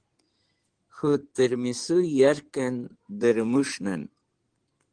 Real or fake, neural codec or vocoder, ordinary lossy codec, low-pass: fake; codec, 16 kHz in and 24 kHz out, 2.2 kbps, FireRedTTS-2 codec; Opus, 16 kbps; 9.9 kHz